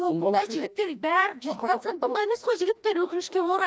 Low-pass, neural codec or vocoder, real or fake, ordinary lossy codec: none; codec, 16 kHz, 1 kbps, FreqCodec, larger model; fake; none